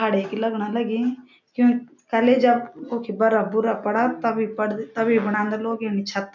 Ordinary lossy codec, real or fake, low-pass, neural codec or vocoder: none; real; 7.2 kHz; none